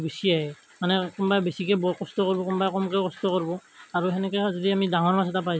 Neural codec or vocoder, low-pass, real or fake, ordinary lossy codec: none; none; real; none